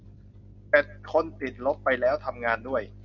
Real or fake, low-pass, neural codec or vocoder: real; 7.2 kHz; none